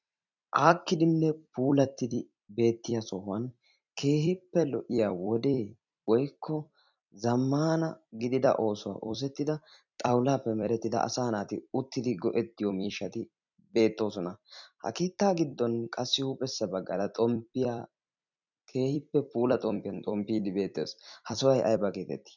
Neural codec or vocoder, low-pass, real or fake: vocoder, 44.1 kHz, 128 mel bands every 256 samples, BigVGAN v2; 7.2 kHz; fake